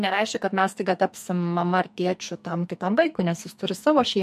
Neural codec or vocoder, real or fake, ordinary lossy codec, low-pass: codec, 44.1 kHz, 2.6 kbps, SNAC; fake; MP3, 64 kbps; 14.4 kHz